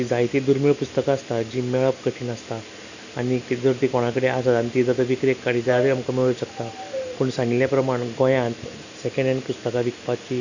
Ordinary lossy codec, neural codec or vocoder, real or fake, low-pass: none; none; real; 7.2 kHz